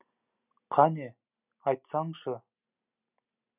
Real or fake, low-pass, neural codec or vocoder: real; 3.6 kHz; none